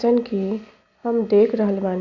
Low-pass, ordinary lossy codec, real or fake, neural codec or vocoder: 7.2 kHz; Opus, 64 kbps; real; none